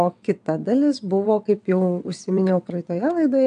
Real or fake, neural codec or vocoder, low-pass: fake; vocoder, 22.05 kHz, 80 mel bands, WaveNeXt; 9.9 kHz